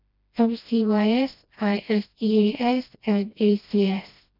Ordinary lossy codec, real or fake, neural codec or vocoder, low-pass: none; fake; codec, 16 kHz, 1 kbps, FreqCodec, smaller model; 5.4 kHz